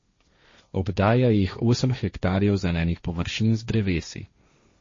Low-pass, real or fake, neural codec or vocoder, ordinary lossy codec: 7.2 kHz; fake; codec, 16 kHz, 1.1 kbps, Voila-Tokenizer; MP3, 32 kbps